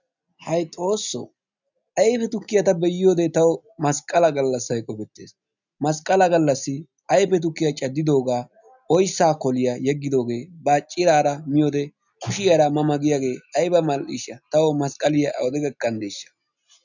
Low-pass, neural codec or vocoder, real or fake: 7.2 kHz; none; real